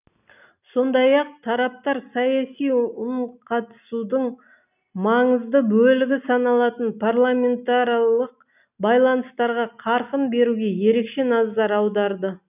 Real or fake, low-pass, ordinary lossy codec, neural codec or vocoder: real; 3.6 kHz; none; none